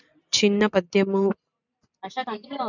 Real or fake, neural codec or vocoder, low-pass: fake; vocoder, 44.1 kHz, 128 mel bands every 512 samples, BigVGAN v2; 7.2 kHz